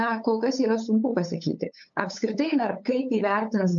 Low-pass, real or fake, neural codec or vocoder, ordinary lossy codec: 7.2 kHz; fake; codec, 16 kHz, 8 kbps, FunCodec, trained on LibriTTS, 25 frames a second; AAC, 64 kbps